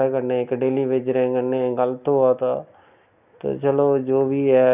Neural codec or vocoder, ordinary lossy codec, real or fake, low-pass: none; none; real; 3.6 kHz